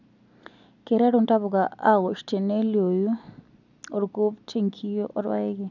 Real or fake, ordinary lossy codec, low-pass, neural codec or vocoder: real; none; 7.2 kHz; none